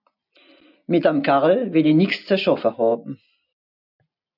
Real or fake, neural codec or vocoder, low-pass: real; none; 5.4 kHz